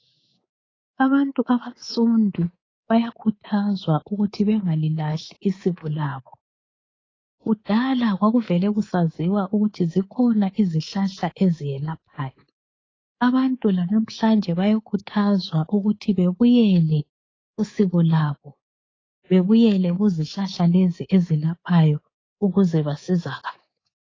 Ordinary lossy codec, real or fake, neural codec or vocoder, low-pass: AAC, 32 kbps; fake; codec, 24 kHz, 3.1 kbps, DualCodec; 7.2 kHz